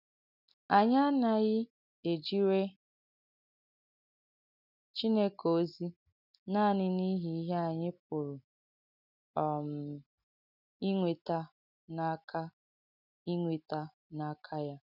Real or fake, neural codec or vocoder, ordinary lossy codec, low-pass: real; none; none; 5.4 kHz